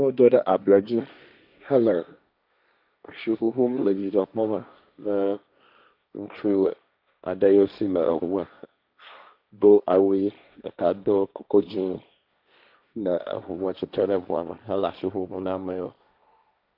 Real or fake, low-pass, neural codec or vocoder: fake; 5.4 kHz; codec, 16 kHz, 1.1 kbps, Voila-Tokenizer